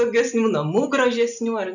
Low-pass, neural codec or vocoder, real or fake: 7.2 kHz; none; real